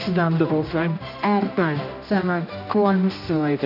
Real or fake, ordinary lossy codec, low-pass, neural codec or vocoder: fake; none; 5.4 kHz; codec, 16 kHz, 1 kbps, X-Codec, HuBERT features, trained on general audio